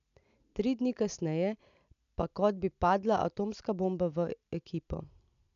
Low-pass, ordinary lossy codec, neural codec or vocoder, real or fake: 7.2 kHz; none; none; real